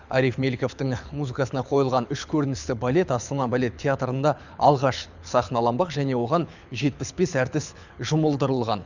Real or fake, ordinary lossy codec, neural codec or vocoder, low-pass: fake; none; codec, 24 kHz, 6 kbps, HILCodec; 7.2 kHz